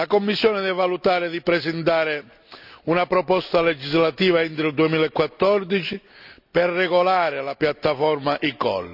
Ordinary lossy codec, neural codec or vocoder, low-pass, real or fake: none; none; 5.4 kHz; real